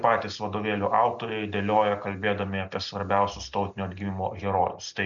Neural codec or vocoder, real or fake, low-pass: none; real; 7.2 kHz